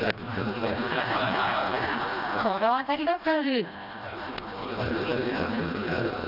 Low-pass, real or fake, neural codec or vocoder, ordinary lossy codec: 5.4 kHz; fake; codec, 16 kHz, 1 kbps, FreqCodec, smaller model; none